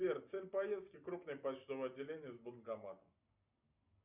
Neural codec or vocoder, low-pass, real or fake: none; 3.6 kHz; real